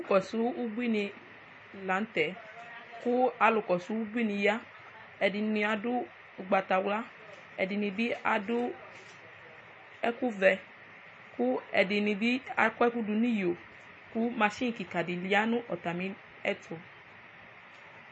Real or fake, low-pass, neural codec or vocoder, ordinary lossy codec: real; 9.9 kHz; none; MP3, 32 kbps